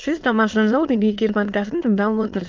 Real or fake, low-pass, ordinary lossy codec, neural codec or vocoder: fake; 7.2 kHz; Opus, 24 kbps; autoencoder, 22.05 kHz, a latent of 192 numbers a frame, VITS, trained on many speakers